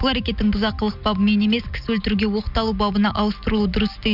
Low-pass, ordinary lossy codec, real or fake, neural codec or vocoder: 5.4 kHz; none; real; none